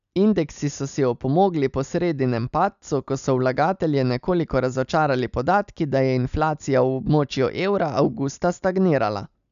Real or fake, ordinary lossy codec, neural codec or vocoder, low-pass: real; none; none; 7.2 kHz